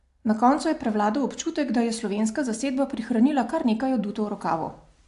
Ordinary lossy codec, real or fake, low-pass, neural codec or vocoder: AAC, 64 kbps; real; 10.8 kHz; none